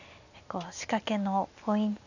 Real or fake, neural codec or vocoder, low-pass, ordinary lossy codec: real; none; 7.2 kHz; none